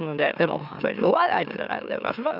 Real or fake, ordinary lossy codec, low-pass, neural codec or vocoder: fake; none; 5.4 kHz; autoencoder, 44.1 kHz, a latent of 192 numbers a frame, MeloTTS